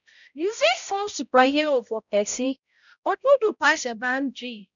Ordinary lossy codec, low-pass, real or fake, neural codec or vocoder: none; 7.2 kHz; fake; codec, 16 kHz, 0.5 kbps, X-Codec, HuBERT features, trained on balanced general audio